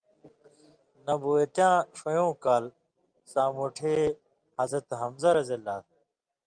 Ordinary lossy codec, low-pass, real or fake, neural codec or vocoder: Opus, 32 kbps; 9.9 kHz; real; none